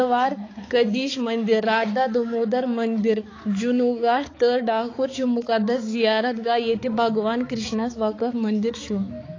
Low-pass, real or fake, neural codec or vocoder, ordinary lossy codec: 7.2 kHz; fake; codec, 16 kHz, 4 kbps, X-Codec, HuBERT features, trained on balanced general audio; AAC, 32 kbps